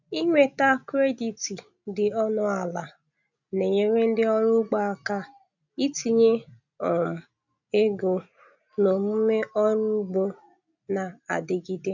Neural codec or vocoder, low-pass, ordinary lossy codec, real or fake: none; 7.2 kHz; none; real